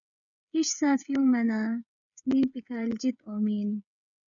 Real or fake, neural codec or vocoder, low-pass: fake; codec, 16 kHz, 8 kbps, FreqCodec, smaller model; 7.2 kHz